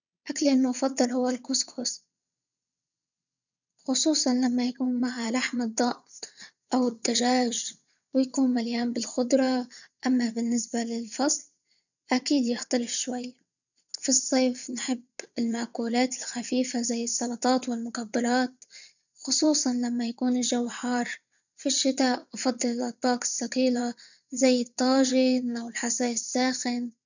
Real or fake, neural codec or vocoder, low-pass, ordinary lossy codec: fake; vocoder, 22.05 kHz, 80 mel bands, WaveNeXt; 7.2 kHz; none